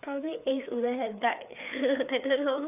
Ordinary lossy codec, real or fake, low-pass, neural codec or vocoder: none; fake; 3.6 kHz; codec, 16 kHz, 4 kbps, FreqCodec, larger model